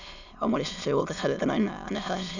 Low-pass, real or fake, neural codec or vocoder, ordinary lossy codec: 7.2 kHz; fake; autoencoder, 22.05 kHz, a latent of 192 numbers a frame, VITS, trained on many speakers; none